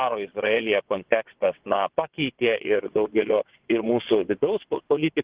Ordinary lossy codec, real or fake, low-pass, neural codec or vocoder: Opus, 16 kbps; fake; 3.6 kHz; vocoder, 22.05 kHz, 80 mel bands, WaveNeXt